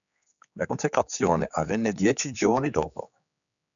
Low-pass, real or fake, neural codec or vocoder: 7.2 kHz; fake; codec, 16 kHz, 4 kbps, X-Codec, HuBERT features, trained on general audio